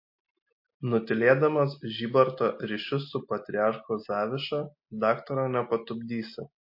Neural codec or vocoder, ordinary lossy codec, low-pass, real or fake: none; MP3, 32 kbps; 5.4 kHz; real